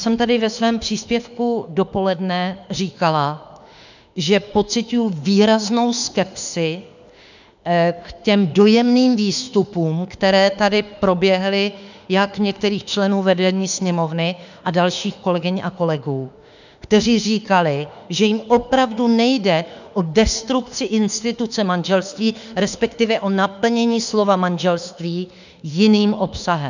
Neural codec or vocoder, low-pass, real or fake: autoencoder, 48 kHz, 32 numbers a frame, DAC-VAE, trained on Japanese speech; 7.2 kHz; fake